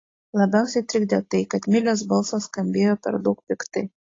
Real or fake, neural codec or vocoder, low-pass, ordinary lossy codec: real; none; 7.2 kHz; AAC, 32 kbps